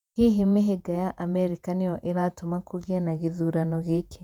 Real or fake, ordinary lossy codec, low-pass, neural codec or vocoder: fake; none; 19.8 kHz; vocoder, 48 kHz, 128 mel bands, Vocos